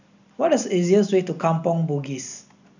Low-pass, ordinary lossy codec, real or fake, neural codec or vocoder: 7.2 kHz; none; real; none